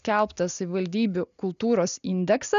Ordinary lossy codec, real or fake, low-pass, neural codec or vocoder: AAC, 96 kbps; real; 7.2 kHz; none